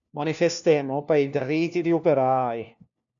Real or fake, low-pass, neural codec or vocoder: fake; 7.2 kHz; codec, 16 kHz, 1 kbps, FunCodec, trained on LibriTTS, 50 frames a second